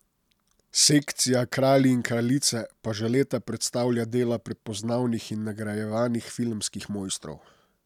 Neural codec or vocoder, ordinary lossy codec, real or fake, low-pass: none; none; real; 19.8 kHz